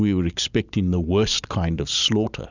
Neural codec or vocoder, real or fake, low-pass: none; real; 7.2 kHz